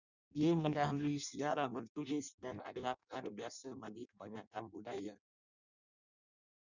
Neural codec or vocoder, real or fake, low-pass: codec, 16 kHz in and 24 kHz out, 0.6 kbps, FireRedTTS-2 codec; fake; 7.2 kHz